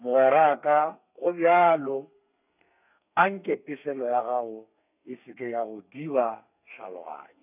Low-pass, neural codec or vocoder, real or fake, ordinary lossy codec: 3.6 kHz; codec, 32 kHz, 1.9 kbps, SNAC; fake; none